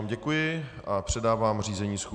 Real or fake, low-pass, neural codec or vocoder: real; 9.9 kHz; none